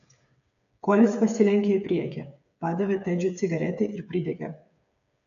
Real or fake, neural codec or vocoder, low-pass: fake; codec, 16 kHz, 8 kbps, FreqCodec, smaller model; 7.2 kHz